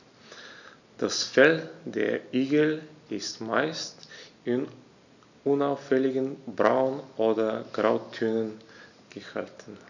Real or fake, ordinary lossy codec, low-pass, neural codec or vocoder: real; none; 7.2 kHz; none